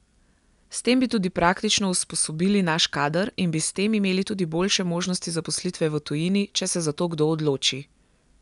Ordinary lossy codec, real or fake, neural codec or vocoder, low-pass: none; real; none; 10.8 kHz